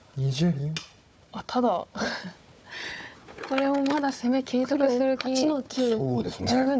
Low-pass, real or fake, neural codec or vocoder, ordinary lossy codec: none; fake; codec, 16 kHz, 4 kbps, FunCodec, trained on Chinese and English, 50 frames a second; none